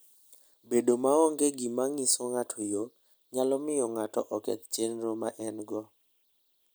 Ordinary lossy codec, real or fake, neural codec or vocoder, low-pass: none; real; none; none